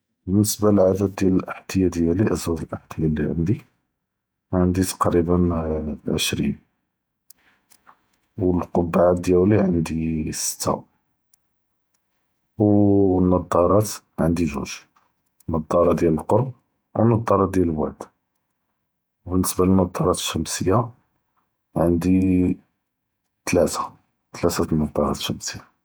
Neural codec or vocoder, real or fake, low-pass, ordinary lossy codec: vocoder, 48 kHz, 128 mel bands, Vocos; fake; none; none